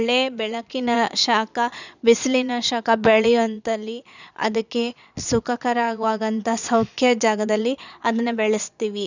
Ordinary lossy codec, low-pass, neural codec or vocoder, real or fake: none; 7.2 kHz; vocoder, 22.05 kHz, 80 mel bands, Vocos; fake